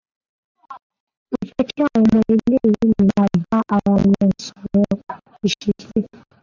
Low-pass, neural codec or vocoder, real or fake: 7.2 kHz; none; real